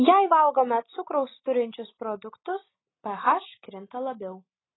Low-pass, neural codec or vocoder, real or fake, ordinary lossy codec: 7.2 kHz; none; real; AAC, 16 kbps